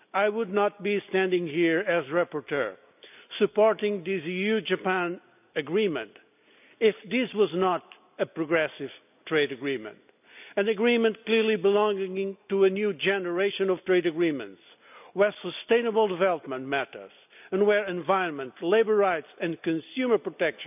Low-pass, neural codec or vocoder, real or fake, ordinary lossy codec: 3.6 kHz; none; real; none